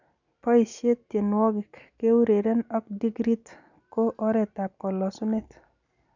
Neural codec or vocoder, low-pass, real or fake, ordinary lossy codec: none; 7.2 kHz; real; none